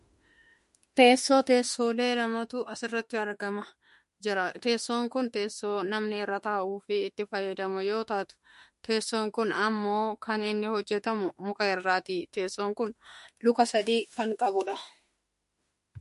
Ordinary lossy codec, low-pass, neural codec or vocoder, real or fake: MP3, 48 kbps; 14.4 kHz; autoencoder, 48 kHz, 32 numbers a frame, DAC-VAE, trained on Japanese speech; fake